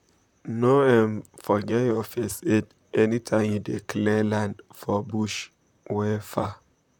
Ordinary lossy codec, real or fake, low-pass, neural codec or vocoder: none; fake; 19.8 kHz; vocoder, 44.1 kHz, 128 mel bands, Pupu-Vocoder